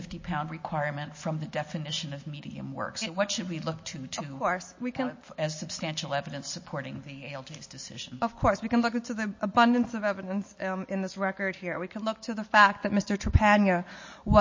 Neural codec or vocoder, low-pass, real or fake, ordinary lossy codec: none; 7.2 kHz; real; MP3, 32 kbps